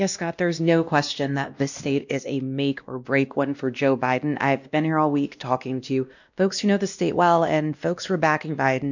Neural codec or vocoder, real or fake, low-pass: codec, 16 kHz, 1 kbps, X-Codec, WavLM features, trained on Multilingual LibriSpeech; fake; 7.2 kHz